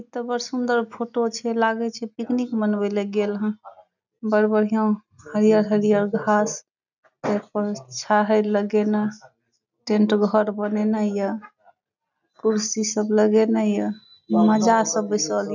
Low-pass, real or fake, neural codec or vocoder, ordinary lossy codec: 7.2 kHz; real; none; none